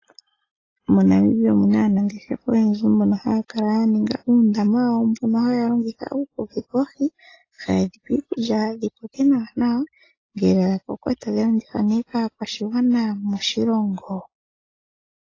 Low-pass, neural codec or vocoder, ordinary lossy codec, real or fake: 7.2 kHz; none; AAC, 32 kbps; real